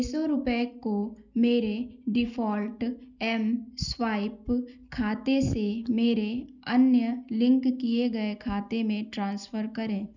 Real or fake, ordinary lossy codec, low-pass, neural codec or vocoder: real; none; 7.2 kHz; none